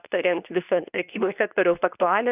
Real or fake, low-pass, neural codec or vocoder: fake; 3.6 kHz; codec, 24 kHz, 0.9 kbps, WavTokenizer, medium speech release version 2